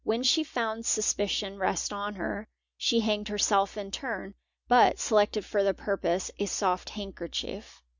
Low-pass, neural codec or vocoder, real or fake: 7.2 kHz; none; real